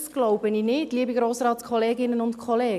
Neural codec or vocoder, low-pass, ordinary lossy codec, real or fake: none; 14.4 kHz; none; real